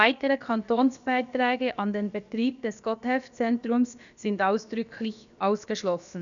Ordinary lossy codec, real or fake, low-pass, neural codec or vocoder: none; fake; 7.2 kHz; codec, 16 kHz, about 1 kbps, DyCAST, with the encoder's durations